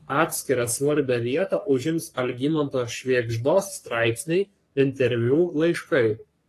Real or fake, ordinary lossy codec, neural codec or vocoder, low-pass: fake; AAC, 48 kbps; codec, 44.1 kHz, 3.4 kbps, Pupu-Codec; 14.4 kHz